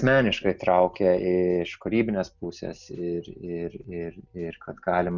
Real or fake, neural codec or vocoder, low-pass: real; none; 7.2 kHz